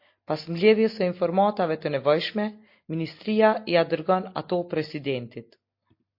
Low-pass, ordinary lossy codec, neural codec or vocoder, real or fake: 5.4 kHz; MP3, 32 kbps; none; real